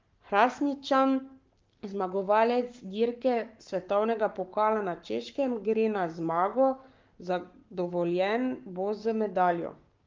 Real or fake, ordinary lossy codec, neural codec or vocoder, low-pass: fake; Opus, 32 kbps; codec, 44.1 kHz, 7.8 kbps, Pupu-Codec; 7.2 kHz